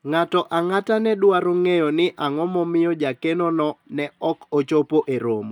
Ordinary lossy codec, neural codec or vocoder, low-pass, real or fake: none; none; 19.8 kHz; real